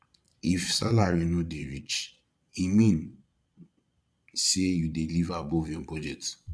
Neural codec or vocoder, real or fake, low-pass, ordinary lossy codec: vocoder, 22.05 kHz, 80 mel bands, Vocos; fake; none; none